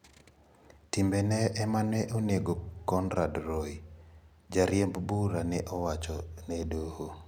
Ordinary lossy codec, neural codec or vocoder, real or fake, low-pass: none; none; real; none